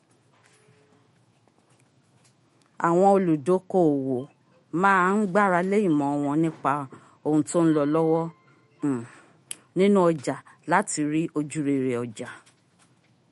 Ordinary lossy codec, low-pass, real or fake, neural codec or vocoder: MP3, 48 kbps; 19.8 kHz; fake; autoencoder, 48 kHz, 128 numbers a frame, DAC-VAE, trained on Japanese speech